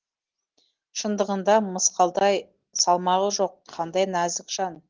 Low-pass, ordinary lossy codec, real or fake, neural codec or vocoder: 7.2 kHz; Opus, 16 kbps; real; none